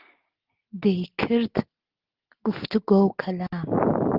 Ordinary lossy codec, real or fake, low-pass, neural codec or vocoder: Opus, 16 kbps; real; 5.4 kHz; none